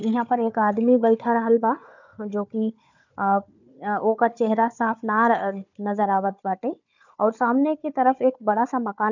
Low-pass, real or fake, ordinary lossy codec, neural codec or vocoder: 7.2 kHz; fake; none; codec, 16 kHz, 4 kbps, FunCodec, trained on Chinese and English, 50 frames a second